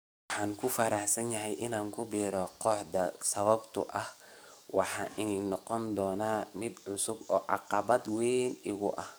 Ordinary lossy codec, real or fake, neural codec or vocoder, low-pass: none; fake; codec, 44.1 kHz, 7.8 kbps, DAC; none